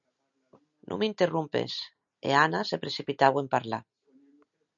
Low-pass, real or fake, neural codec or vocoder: 7.2 kHz; real; none